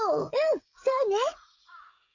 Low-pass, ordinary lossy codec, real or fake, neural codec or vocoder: 7.2 kHz; MP3, 64 kbps; fake; codec, 16 kHz, 16 kbps, FreqCodec, smaller model